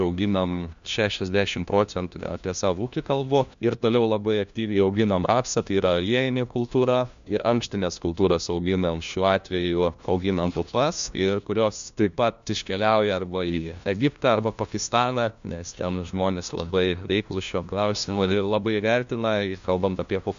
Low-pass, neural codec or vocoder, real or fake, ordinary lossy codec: 7.2 kHz; codec, 16 kHz, 1 kbps, FunCodec, trained on LibriTTS, 50 frames a second; fake; MP3, 64 kbps